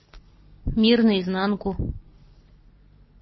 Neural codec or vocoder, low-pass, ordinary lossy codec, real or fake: none; 7.2 kHz; MP3, 24 kbps; real